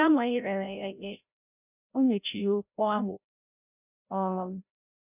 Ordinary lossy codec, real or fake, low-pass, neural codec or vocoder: none; fake; 3.6 kHz; codec, 16 kHz, 0.5 kbps, FreqCodec, larger model